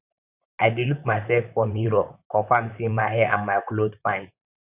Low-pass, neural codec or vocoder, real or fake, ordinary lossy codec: 3.6 kHz; vocoder, 44.1 kHz, 128 mel bands, Pupu-Vocoder; fake; Opus, 64 kbps